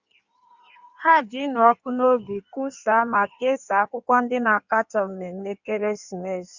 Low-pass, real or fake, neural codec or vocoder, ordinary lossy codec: 7.2 kHz; fake; codec, 16 kHz in and 24 kHz out, 1.1 kbps, FireRedTTS-2 codec; Opus, 64 kbps